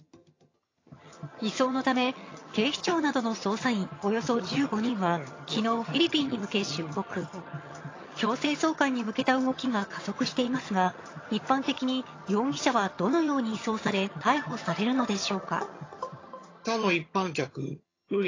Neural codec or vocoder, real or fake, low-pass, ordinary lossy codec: vocoder, 22.05 kHz, 80 mel bands, HiFi-GAN; fake; 7.2 kHz; AAC, 32 kbps